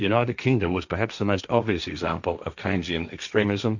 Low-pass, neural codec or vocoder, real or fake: 7.2 kHz; codec, 16 kHz, 1.1 kbps, Voila-Tokenizer; fake